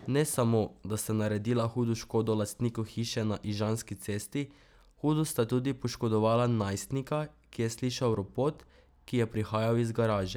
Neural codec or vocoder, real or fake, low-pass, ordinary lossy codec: none; real; none; none